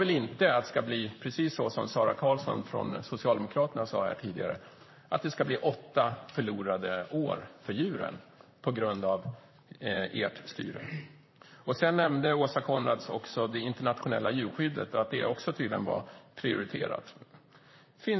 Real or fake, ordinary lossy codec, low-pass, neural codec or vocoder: fake; MP3, 24 kbps; 7.2 kHz; vocoder, 44.1 kHz, 128 mel bands, Pupu-Vocoder